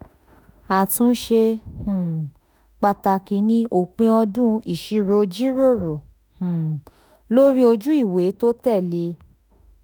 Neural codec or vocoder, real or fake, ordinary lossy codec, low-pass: autoencoder, 48 kHz, 32 numbers a frame, DAC-VAE, trained on Japanese speech; fake; none; none